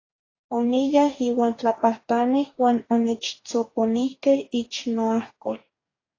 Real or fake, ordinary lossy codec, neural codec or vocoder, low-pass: fake; AAC, 32 kbps; codec, 44.1 kHz, 2.6 kbps, DAC; 7.2 kHz